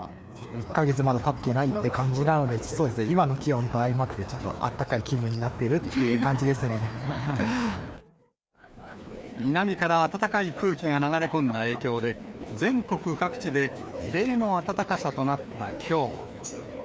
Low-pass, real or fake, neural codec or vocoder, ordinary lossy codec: none; fake; codec, 16 kHz, 2 kbps, FreqCodec, larger model; none